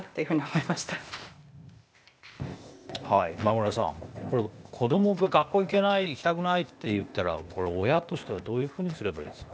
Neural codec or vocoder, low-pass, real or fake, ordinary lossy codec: codec, 16 kHz, 0.8 kbps, ZipCodec; none; fake; none